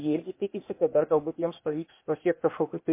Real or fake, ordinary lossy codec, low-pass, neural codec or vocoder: fake; MP3, 24 kbps; 3.6 kHz; codec, 16 kHz, 0.8 kbps, ZipCodec